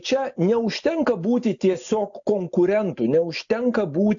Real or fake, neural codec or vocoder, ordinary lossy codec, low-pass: real; none; AAC, 64 kbps; 7.2 kHz